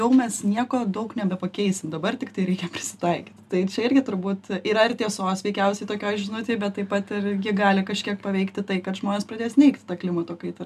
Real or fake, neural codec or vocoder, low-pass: real; none; 14.4 kHz